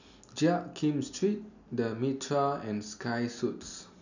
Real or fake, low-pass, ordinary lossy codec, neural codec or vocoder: real; 7.2 kHz; none; none